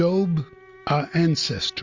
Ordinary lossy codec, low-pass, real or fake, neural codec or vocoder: Opus, 64 kbps; 7.2 kHz; real; none